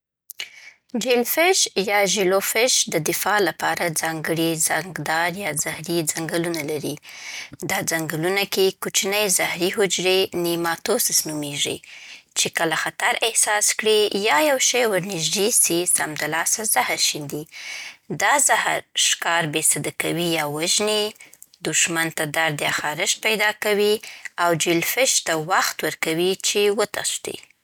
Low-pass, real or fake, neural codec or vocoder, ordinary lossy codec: none; real; none; none